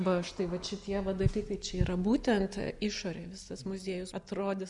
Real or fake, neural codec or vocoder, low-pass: real; none; 10.8 kHz